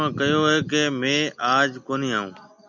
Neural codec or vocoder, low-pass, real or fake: none; 7.2 kHz; real